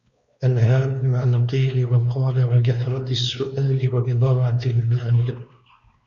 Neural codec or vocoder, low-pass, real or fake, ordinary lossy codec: codec, 16 kHz, 2 kbps, X-Codec, WavLM features, trained on Multilingual LibriSpeech; 7.2 kHz; fake; Opus, 64 kbps